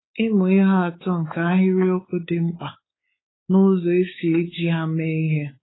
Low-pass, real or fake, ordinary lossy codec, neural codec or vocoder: 7.2 kHz; fake; AAC, 16 kbps; codec, 16 kHz, 4 kbps, X-Codec, WavLM features, trained on Multilingual LibriSpeech